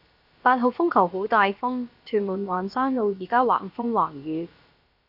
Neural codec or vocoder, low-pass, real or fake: codec, 16 kHz, about 1 kbps, DyCAST, with the encoder's durations; 5.4 kHz; fake